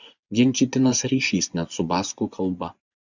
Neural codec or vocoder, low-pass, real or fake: vocoder, 24 kHz, 100 mel bands, Vocos; 7.2 kHz; fake